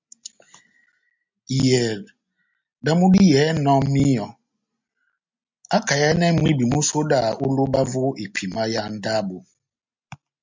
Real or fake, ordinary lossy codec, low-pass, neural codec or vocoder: real; MP3, 64 kbps; 7.2 kHz; none